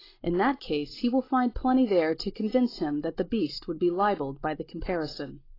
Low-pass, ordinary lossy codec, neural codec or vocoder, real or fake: 5.4 kHz; AAC, 24 kbps; none; real